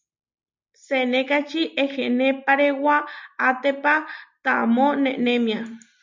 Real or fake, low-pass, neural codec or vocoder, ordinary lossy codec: real; 7.2 kHz; none; MP3, 64 kbps